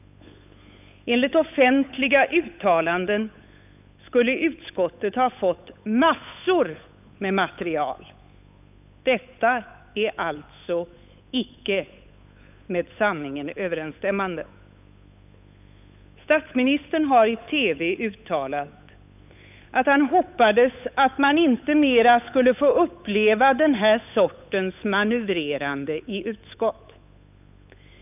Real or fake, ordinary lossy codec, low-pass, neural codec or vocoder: fake; none; 3.6 kHz; codec, 16 kHz, 8 kbps, FunCodec, trained on Chinese and English, 25 frames a second